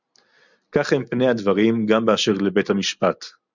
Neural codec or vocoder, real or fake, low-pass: none; real; 7.2 kHz